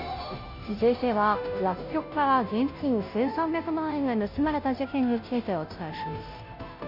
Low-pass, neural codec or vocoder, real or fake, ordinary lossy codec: 5.4 kHz; codec, 16 kHz, 0.5 kbps, FunCodec, trained on Chinese and English, 25 frames a second; fake; none